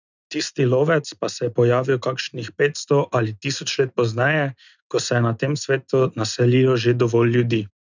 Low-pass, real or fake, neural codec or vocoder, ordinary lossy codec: 7.2 kHz; real; none; none